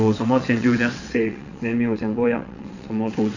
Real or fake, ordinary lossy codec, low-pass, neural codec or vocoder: fake; none; 7.2 kHz; vocoder, 22.05 kHz, 80 mel bands, WaveNeXt